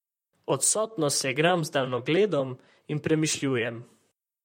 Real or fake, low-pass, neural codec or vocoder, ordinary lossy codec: fake; 19.8 kHz; vocoder, 44.1 kHz, 128 mel bands, Pupu-Vocoder; MP3, 64 kbps